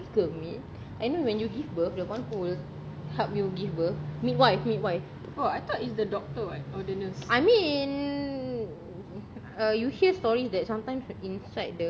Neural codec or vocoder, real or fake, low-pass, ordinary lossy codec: none; real; none; none